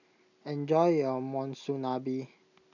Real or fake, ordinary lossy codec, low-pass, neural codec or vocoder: real; none; 7.2 kHz; none